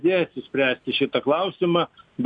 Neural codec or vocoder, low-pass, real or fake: none; 9.9 kHz; real